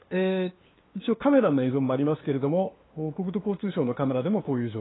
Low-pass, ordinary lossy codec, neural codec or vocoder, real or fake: 7.2 kHz; AAC, 16 kbps; codec, 16 kHz, 2 kbps, FunCodec, trained on LibriTTS, 25 frames a second; fake